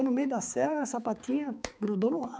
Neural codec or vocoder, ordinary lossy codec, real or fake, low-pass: codec, 16 kHz, 4 kbps, X-Codec, HuBERT features, trained on balanced general audio; none; fake; none